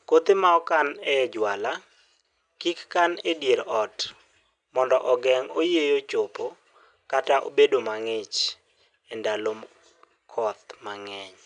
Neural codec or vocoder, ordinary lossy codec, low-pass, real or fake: none; none; 9.9 kHz; real